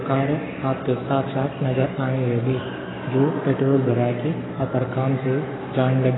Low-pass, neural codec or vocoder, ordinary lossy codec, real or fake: 7.2 kHz; codec, 44.1 kHz, 7.8 kbps, Pupu-Codec; AAC, 16 kbps; fake